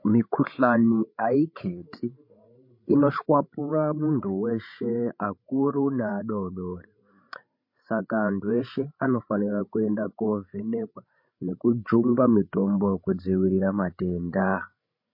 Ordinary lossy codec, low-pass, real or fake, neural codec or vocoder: MP3, 32 kbps; 5.4 kHz; fake; codec, 16 kHz, 16 kbps, FreqCodec, larger model